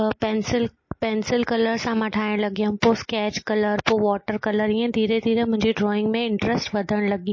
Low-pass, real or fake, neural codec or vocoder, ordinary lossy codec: 7.2 kHz; real; none; MP3, 32 kbps